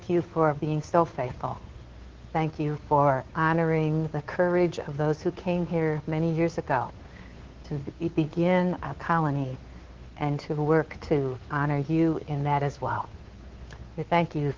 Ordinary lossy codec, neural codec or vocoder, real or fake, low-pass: Opus, 16 kbps; codec, 16 kHz, 2 kbps, FunCodec, trained on Chinese and English, 25 frames a second; fake; 7.2 kHz